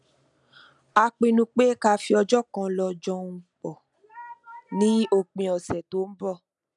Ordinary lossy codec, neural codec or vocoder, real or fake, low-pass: none; none; real; 10.8 kHz